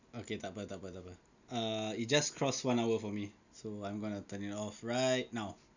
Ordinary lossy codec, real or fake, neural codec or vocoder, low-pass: none; real; none; 7.2 kHz